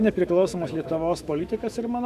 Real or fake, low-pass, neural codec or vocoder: fake; 14.4 kHz; autoencoder, 48 kHz, 128 numbers a frame, DAC-VAE, trained on Japanese speech